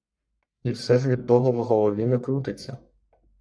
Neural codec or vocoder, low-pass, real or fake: codec, 44.1 kHz, 1.7 kbps, Pupu-Codec; 9.9 kHz; fake